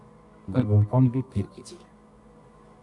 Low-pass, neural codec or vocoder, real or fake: 10.8 kHz; codec, 24 kHz, 0.9 kbps, WavTokenizer, medium music audio release; fake